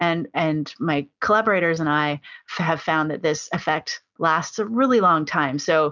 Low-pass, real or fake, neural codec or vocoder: 7.2 kHz; real; none